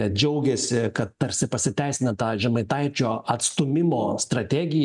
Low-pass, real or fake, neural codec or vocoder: 10.8 kHz; real; none